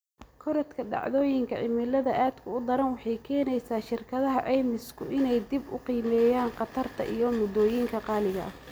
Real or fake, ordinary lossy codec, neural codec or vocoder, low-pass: real; none; none; none